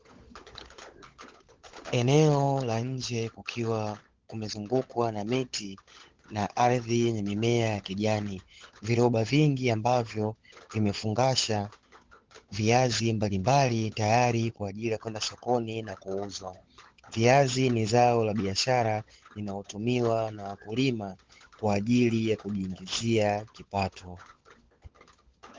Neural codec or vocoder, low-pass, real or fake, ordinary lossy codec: codec, 16 kHz, 16 kbps, FunCodec, trained on LibriTTS, 50 frames a second; 7.2 kHz; fake; Opus, 16 kbps